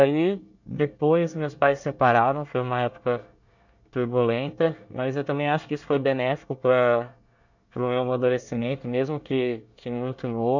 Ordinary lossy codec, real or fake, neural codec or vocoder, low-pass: none; fake; codec, 24 kHz, 1 kbps, SNAC; 7.2 kHz